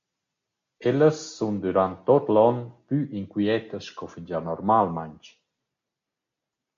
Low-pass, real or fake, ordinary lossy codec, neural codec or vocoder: 7.2 kHz; real; MP3, 48 kbps; none